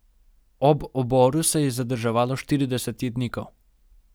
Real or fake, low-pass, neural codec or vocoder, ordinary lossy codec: real; none; none; none